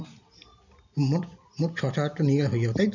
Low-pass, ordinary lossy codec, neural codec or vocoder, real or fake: 7.2 kHz; none; none; real